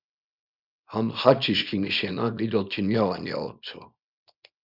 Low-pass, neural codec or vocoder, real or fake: 5.4 kHz; codec, 24 kHz, 0.9 kbps, WavTokenizer, small release; fake